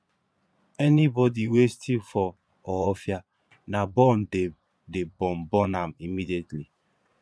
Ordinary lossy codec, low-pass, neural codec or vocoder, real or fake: none; none; vocoder, 22.05 kHz, 80 mel bands, Vocos; fake